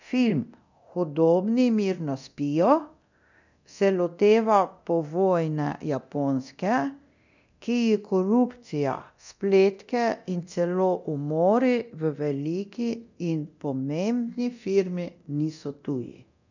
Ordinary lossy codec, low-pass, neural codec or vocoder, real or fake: none; 7.2 kHz; codec, 24 kHz, 0.9 kbps, DualCodec; fake